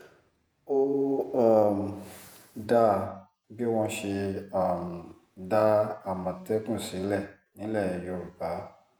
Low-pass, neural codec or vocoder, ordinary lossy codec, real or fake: none; vocoder, 48 kHz, 128 mel bands, Vocos; none; fake